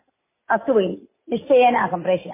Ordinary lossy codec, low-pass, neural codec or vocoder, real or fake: MP3, 16 kbps; 3.6 kHz; none; real